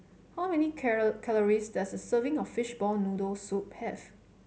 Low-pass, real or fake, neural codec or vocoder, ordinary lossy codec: none; real; none; none